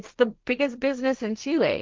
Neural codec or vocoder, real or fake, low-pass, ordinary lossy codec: codec, 16 kHz, 1.1 kbps, Voila-Tokenizer; fake; 7.2 kHz; Opus, 24 kbps